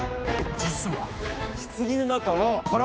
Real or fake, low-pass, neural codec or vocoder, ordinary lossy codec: fake; none; codec, 16 kHz, 2 kbps, X-Codec, HuBERT features, trained on general audio; none